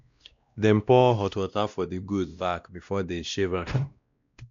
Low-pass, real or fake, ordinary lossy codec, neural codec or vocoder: 7.2 kHz; fake; MP3, 64 kbps; codec, 16 kHz, 1 kbps, X-Codec, WavLM features, trained on Multilingual LibriSpeech